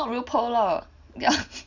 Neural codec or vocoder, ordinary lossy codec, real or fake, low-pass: none; none; real; 7.2 kHz